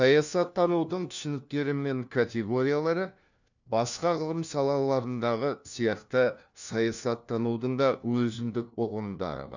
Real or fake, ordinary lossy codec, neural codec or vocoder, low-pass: fake; none; codec, 16 kHz, 1 kbps, FunCodec, trained on LibriTTS, 50 frames a second; 7.2 kHz